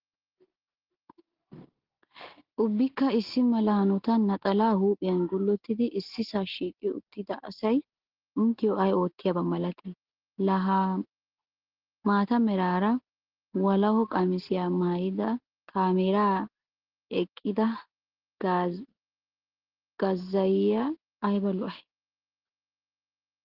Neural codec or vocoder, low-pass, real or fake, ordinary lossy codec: none; 5.4 kHz; real; Opus, 16 kbps